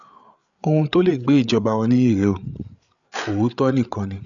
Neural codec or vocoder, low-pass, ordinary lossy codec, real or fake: codec, 16 kHz, 8 kbps, FreqCodec, larger model; 7.2 kHz; none; fake